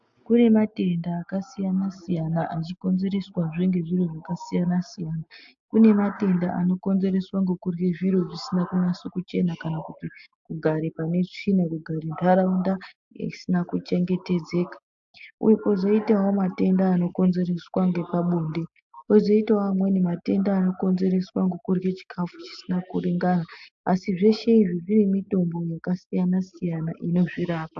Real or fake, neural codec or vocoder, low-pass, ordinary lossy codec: real; none; 7.2 kHz; AAC, 64 kbps